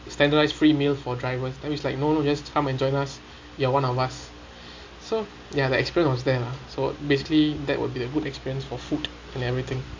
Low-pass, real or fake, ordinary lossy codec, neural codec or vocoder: 7.2 kHz; real; MP3, 48 kbps; none